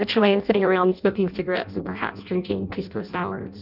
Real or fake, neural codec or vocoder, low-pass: fake; codec, 16 kHz in and 24 kHz out, 0.6 kbps, FireRedTTS-2 codec; 5.4 kHz